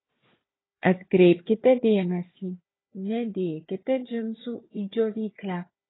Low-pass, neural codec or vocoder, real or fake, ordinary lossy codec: 7.2 kHz; codec, 16 kHz, 4 kbps, FunCodec, trained on Chinese and English, 50 frames a second; fake; AAC, 16 kbps